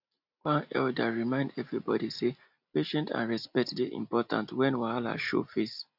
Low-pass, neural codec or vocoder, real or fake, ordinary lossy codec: 5.4 kHz; none; real; none